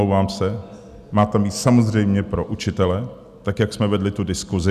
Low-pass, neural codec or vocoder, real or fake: 14.4 kHz; none; real